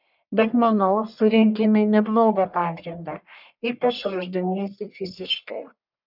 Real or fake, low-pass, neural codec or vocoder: fake; 5.4 kHz; codec, 44.1 kHz, 1.7 kbps, Pupu-Codec